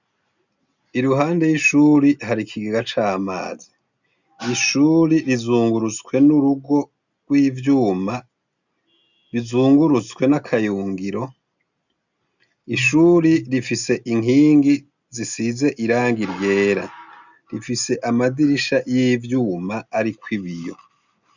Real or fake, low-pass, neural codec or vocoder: real; 7.2 kHz; none